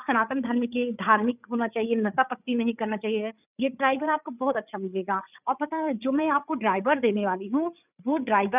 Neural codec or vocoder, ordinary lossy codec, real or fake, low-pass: codec, 16 kHz, 8 kbps, FreqCodec, larger model; none; fake; 3.6 kHz